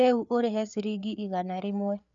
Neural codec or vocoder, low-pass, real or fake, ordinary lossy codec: codec, 16 kHz, 4 kbps, FreqCodec, larger model; 7.2 kHz; fake; none